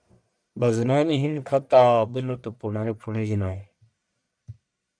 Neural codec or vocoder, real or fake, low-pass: codec, 44.1 kHz, 1.7 kbps, Pupu-Codec; fake; 9.9 kHz